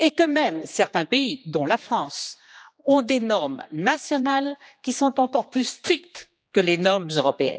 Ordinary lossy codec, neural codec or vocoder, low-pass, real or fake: none; codec, 16 kHz, 2 kbps, X-Codec, HuBERT features, trained on general audio; none; fake